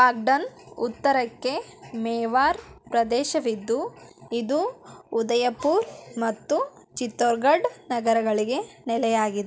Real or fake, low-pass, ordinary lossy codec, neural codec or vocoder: real; none; none; none